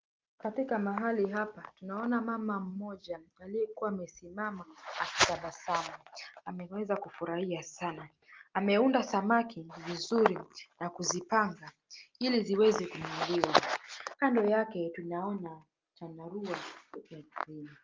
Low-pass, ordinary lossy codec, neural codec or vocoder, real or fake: 7.2 kHz; Opus, 32 kbps; none; real